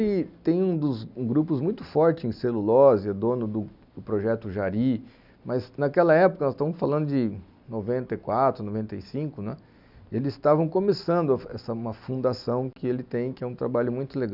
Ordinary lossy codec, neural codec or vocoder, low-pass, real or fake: none; none; 5.4 kHz; real